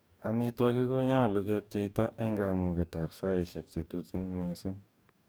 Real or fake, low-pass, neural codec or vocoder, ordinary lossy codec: fake; none; codec, 44.1 kHz, 2.6 kbps, DAC; none